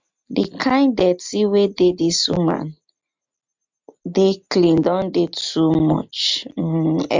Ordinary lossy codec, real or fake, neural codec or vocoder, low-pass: MP3, 64 kbps; real; none; 7.2 kHz